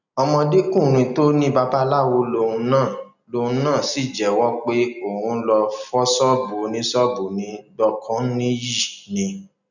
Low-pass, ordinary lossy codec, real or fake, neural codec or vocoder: 7.2 kHz; none; real; none